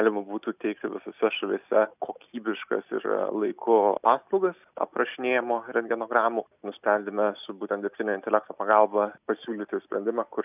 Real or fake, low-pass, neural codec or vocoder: real; 3.6 kHz; none